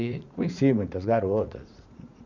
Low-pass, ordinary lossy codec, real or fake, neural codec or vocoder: 7.2 kHz; none; fake; vocoder, 44.1 kHz, 80 mel bands, Vocos